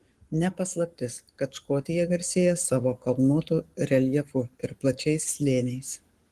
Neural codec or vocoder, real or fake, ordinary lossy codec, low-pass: codec, 44.1 kHz, 7.8 kbps, Pupu-Codec; fake; Opus, 24 kbps; 14.4 kHz